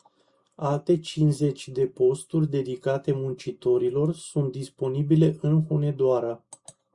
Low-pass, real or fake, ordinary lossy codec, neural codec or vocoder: 10.8 kHz; real; Opus, 64 kbps; none